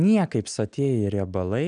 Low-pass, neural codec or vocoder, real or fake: 9.9 kHz; none; real